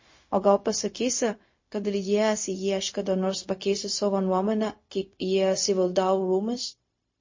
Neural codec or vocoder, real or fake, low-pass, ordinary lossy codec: codec, 16 kHz, 0.4 kbps, LongCat-Audio-Codec; fake; 7.2 kHz; MP3, 32 kbps